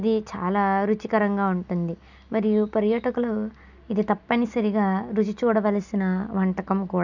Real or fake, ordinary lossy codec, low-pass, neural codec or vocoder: real; none; 7.2 kHz; none